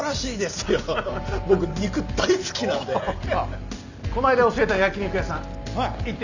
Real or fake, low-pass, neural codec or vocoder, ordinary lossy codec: real; 7.2 kHz; none; none